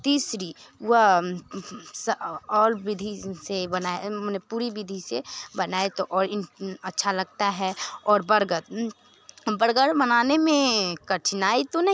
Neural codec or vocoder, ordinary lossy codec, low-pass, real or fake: none; none; none; real